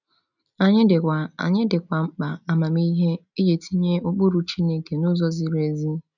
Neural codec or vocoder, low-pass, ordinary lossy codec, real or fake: none; 7.2 kHz; none; real